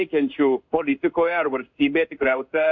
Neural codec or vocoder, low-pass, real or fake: codec, 16 kHz in and 24 kHz out, 1 kbps, XY-Tokenizer; 7.2 kHz; fake